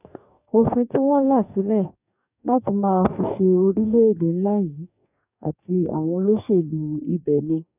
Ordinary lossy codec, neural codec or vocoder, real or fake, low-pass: none; codec, 44.1 kHz, 2.6 kbps, DAC; fake; 3.6 kHz